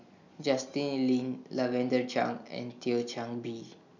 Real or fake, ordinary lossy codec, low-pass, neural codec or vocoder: real; none; 7.2 kHz; none